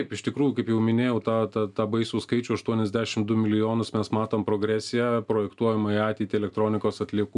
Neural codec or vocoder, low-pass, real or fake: none; 10.8 kHz; real